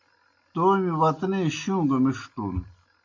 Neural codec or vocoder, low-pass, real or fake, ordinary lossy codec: none; 7.2 kHz; real; MP3, 64 kbps